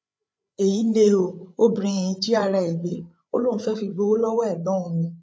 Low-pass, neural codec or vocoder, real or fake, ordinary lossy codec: none; codec, 16 kHz, 8 kbps, FreqCodec, larger model; fake; none